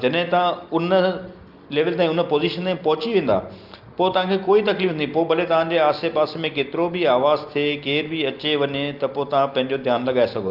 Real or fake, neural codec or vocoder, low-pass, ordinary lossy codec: real; none; 5.4 kHz; Opus, 24 kbps